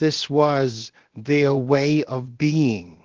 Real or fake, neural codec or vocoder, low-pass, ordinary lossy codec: fake; codec, 16 kHz, 0.7 kbps, FocalCodec; 7.2 kHz; Opus, 16 kbps